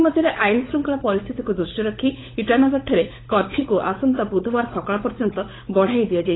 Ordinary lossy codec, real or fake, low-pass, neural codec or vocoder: AAC, 16 kbps; fake; 7.2 kHz; codec, 16 kHz, 16 kbps, FunCodec, trained on LibriTTS, 50 frames a second